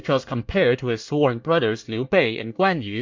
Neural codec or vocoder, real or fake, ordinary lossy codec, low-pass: codec, 24 kHz, 1 kbps, SNAC; fake; MP3, 64 kbps; 7.2 kHz